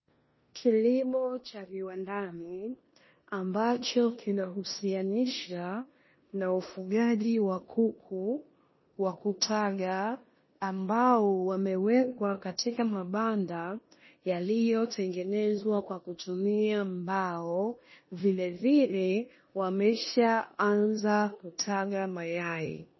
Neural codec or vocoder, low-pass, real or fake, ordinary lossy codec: codec, 16 kHz in and 24 kHz out, 0.9 kbps, LongCat-Audio-Codec, four codebook decoder; 7.2 kHz; fake; MP3, 24 kbps